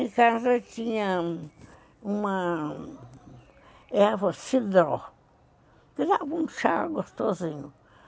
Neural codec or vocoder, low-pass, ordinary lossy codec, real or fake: none; none; none; real